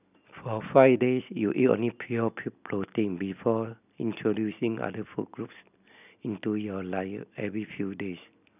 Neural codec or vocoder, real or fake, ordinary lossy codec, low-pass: none; real; none; 3.6 kHz